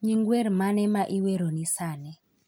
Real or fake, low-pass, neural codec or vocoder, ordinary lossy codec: real; none; none; none